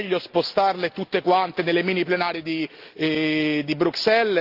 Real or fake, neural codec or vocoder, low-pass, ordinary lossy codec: real; none; 5.4 kHz; Opus, 24 kbps